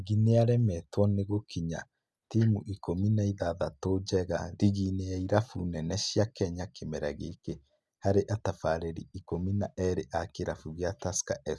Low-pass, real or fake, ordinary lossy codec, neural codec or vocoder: none; real; none; none